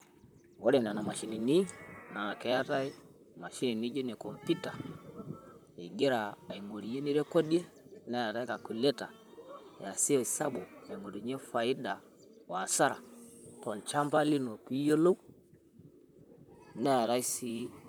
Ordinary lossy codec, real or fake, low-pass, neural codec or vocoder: none; fake; none; vocoder, 44.1 kHz, 128 mel bands, Pupu-Vocoder